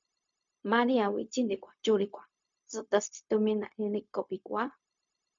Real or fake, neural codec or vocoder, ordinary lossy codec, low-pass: fake; codec, 16 kHz, 0.4 kbps, LongCat-Audio-Codec; MP3, 64 kbps; 7.2 kHz